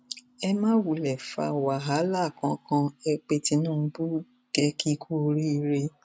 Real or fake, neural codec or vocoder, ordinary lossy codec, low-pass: real; none; none; none